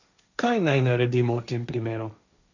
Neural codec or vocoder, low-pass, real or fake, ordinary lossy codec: codec, 16 kHz, 1.1 kbps, Voila-Tokenizer; 7.2 kHz; fake; none